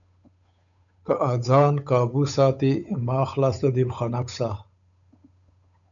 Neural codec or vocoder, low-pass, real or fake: codec, 16 kHz, 8 kbps, FunCodec, trained on Chinese and English, 25 frames a second; 7.2 kHz; fake